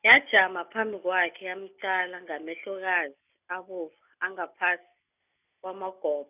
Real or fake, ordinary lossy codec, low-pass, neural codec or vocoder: real; none; 3.6 kHz; none